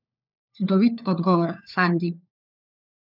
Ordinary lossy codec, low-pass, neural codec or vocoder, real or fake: none; 5.4 kHz; codec, 16 kHz, 4 kbps, FunCodec, trained on LibriTTS, 50 frames a second; fake